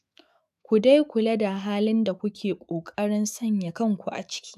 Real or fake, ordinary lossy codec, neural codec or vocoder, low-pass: fake; AAC, 96 kbps; autoencoder, 48 kHz, 128 numbers a frame, DAC-VAE, trained on Japanese speech; 14.4 kHz